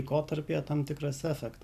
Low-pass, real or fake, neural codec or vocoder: 14.4 kHz; real; none